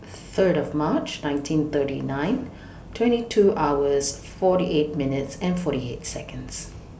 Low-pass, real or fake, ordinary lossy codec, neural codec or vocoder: none; real; none; none